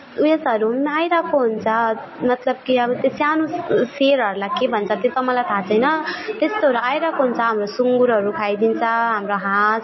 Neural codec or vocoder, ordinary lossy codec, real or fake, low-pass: none; MP3, 24 kbps; real; 7.2 kHz